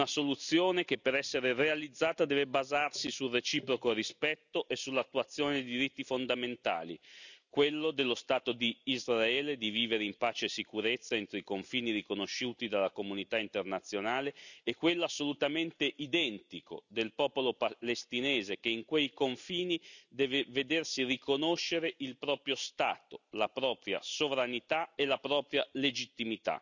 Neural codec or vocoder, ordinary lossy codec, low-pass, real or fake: none; none; 7.2 kHz; real